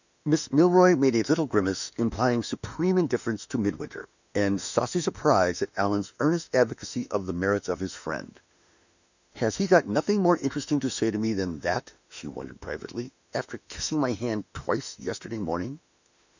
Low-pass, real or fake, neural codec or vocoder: 7.2 kHz; fake; autoencoder, 48 kHz, 32 numbers a frame, DAC-VAE, trained on Japanese speech